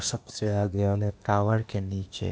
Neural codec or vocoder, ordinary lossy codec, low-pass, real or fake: codec, 16 kHz, 0.8 kbps, ZipCodec; none; none; fake